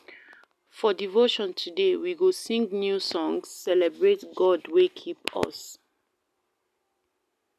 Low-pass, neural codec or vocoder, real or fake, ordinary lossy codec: 14.4 kHz; none; real; none